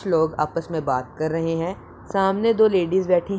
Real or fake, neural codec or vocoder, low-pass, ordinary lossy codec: real; none; none; none